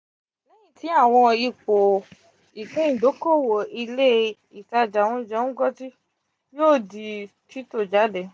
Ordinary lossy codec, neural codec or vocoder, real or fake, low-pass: none; none; real; none